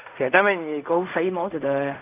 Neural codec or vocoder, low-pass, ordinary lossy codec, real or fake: codec, 16 kHz in and 24 kHz out, 0.4 kbps, LongCat-Audio-Codec, fine tuned four codebook decoder; 3.6 kHz; none; fake